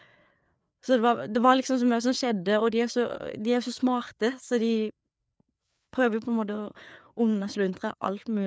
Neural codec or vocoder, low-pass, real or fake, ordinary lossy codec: codec, 16 kHz, 4 kbps, FreqCodec, larger model; none; fake; none